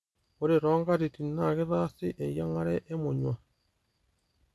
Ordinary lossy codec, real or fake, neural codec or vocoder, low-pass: none; real; none; none